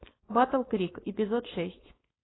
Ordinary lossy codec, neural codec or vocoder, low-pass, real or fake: AAC, 16 kbps; codec, 16 kHz, 4.8 kbps, FACodec; 7.2 kHz; fake